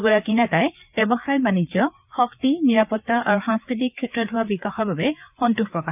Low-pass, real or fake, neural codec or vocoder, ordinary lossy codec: 3.6 kHz; fake; codec, 16 kHz, 4 kbps, FreqCodec, larger model; none